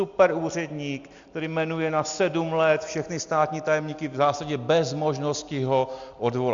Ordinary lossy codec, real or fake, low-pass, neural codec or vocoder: Opus, 64 kbps; real; 7.2 kHz; none